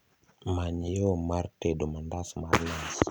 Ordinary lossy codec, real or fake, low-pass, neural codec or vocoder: none; real; none; none